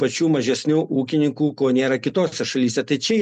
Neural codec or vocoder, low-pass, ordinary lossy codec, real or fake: none; 10.8 kHz; MP3, 64 kbps; real